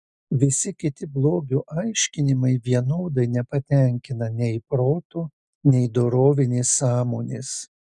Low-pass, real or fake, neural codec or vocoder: 10.8 kHz; real; none